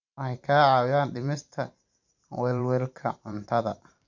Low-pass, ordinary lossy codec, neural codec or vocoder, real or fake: 7.2 kHz; MP3, 64 kbps; vocoder, 44.1 kHz, 128 mel bands every 512 samples, BigVGAN v2; fake